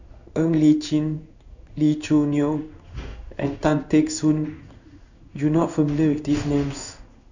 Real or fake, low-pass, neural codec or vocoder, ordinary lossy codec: fake; 7.2 kHz; codec, 16 kHz in and 24 kHz out, 1 kbps, XY-Tokenizer; none